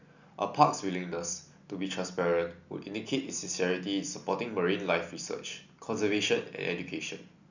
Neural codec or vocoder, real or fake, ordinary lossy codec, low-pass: none; real; none; 7.2 kHz